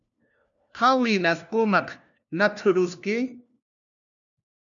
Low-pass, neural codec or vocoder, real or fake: 7.2 kHz; codec, 16 kHz, 1 kbps, FunCodec, trained on LibriTTS, 50 frames a second; fake